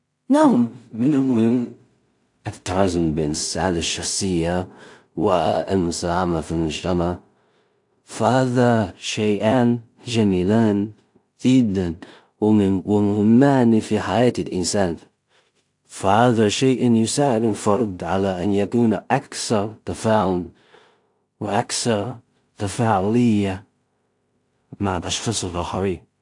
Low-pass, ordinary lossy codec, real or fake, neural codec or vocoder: 10.8 kHz; AAC, 64 kbps; fake; codec, 16 kHz in and 24 kHz out, 0.4 kbps, LongCat-Audio-Codec, two codebook decoder